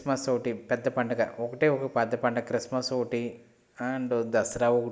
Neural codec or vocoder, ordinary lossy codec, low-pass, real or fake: none; none; none; real